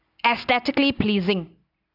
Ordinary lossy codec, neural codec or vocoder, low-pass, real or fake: none; none; 5.4 kHz; real